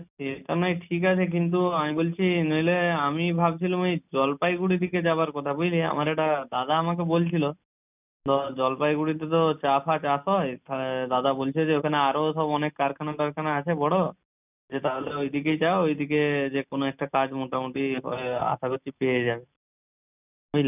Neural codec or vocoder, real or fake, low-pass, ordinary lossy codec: none; real; 3.6 kHz; none